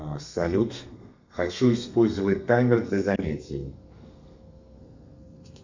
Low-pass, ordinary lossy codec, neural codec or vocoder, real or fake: 7.2 kHz; MP3, 64 kbps; codec, 32 kHz, 1.9 kbps, SNAC; fake